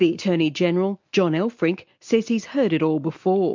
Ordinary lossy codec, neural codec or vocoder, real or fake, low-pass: MP3, 48 kbps; none; real; 7.2 kHz